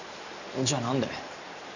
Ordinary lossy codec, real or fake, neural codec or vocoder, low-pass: none; real; none; 7.2 kHz